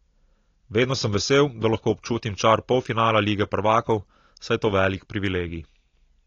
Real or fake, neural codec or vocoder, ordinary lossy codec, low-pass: real; none; AAC, 32 kbps; 7.2 kHz